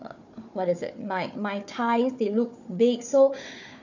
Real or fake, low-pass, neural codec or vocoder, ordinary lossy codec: fake; 7.2 kHz; codec, 16 kHz, 4 kbps, FunCodec, trained on Chinese and English, 50 frames a second; none